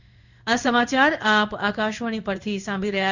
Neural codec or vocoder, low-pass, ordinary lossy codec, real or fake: codec, 16 kHz in and 24 kHz out, 1 kbps, XY-Tokenizer; 7.2 kHz; none; fake